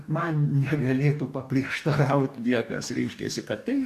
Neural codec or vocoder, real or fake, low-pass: codec, 44.1 kHz, 2.6 kbps, DAC; fake; 14.4 kHz